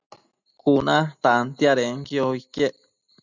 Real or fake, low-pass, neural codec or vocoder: real; 7.2 kHz; none